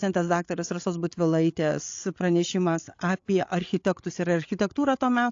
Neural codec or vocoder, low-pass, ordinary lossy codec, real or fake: codec, 16 kHz, 8 kbps, FreqCodec, larger model; 7.2 kHz; AAC, 48 kbps; fake